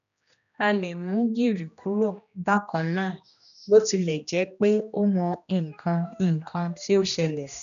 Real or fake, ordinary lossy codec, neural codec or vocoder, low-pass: fake; none; codec, 16 kHz, 1 kbps, X-Codec, HuBERT features, trained on general audio; 7.2 kHz